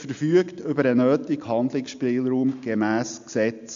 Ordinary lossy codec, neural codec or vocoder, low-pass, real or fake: AAC, 64 kbps; none; 7.2 kHz; real